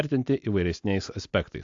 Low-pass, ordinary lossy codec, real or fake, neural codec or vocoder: 7.2 kHz; AAC, 64 kbps; real; none